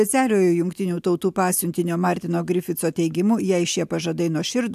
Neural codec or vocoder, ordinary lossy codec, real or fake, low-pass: none; AAC, 96 kbps; real; 14.4 kHz